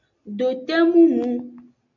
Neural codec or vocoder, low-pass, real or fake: none; 7.2 kHz; real